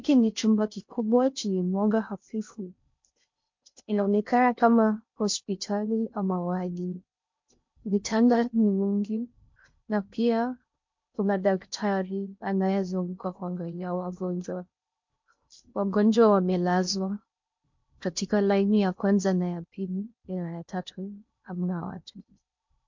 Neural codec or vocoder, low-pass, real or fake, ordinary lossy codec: codec, 16 kHz in and 24 kHz out, 0.6 kbps, FocalCodec, streaming, 2048 codes; 7.2 kHz; fake; MP3, 48 kbps